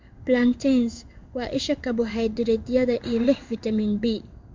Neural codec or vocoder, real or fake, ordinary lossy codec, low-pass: codec, 16 kHz in and 24 kHz out, 1 kbps, XY-Tokenizer; fake; MP3, 64 kbps; 7.2 kHz